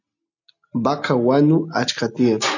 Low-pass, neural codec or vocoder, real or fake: 7.2 kHz; none; real